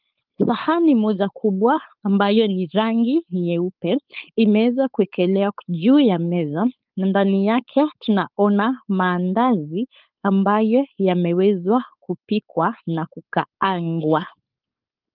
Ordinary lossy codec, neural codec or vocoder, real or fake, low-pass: Opus, 24 kbps; codec, 16 kHz, 4.8 kbps, FACodec; fake; 5.4 kHz